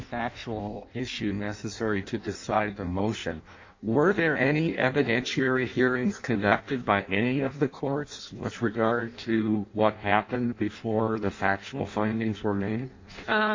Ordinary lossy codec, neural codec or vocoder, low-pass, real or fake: MP3, 48 kbps; codec, 16 kHz in and 24 kHz out, 0.6 kbps, FireRedTTS-2 codec; 7.2 kHz; fake